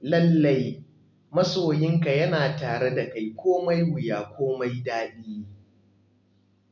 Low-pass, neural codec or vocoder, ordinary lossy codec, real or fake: 7.2 kHz; none; none; real